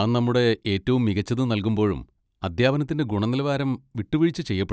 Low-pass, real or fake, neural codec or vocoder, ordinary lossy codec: none; real; none; none